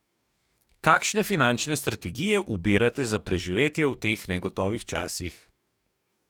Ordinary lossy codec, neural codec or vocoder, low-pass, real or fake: none; codec, 44.1 kHz, 2.6 kbps, DAC; 19.8 kHz; fake